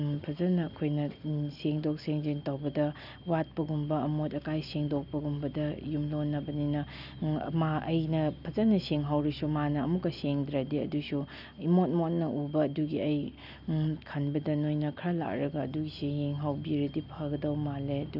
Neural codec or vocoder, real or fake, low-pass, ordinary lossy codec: none; real; 5.4 kHz; none